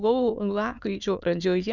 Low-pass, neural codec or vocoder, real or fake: 7.2 kHz; autoencoder, 22.05 kHz, a latent of 192 numbers a frame, VITS, trained on many speakers; fake